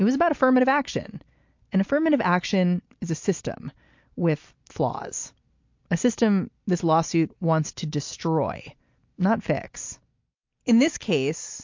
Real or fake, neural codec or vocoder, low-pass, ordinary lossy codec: real; none; 7.2 kHz; MP3, 48 kbps